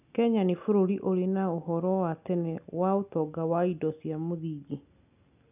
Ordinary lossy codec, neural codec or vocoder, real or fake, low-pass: none; none; real; 3.6 kHz